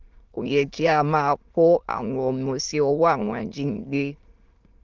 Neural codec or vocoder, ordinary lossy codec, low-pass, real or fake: autoencoder, 22.05 kHz, a latent of 192 numbers a frame, VITS, trained on many speakers; Opus, 32 kbps; 7.2 kHz; fake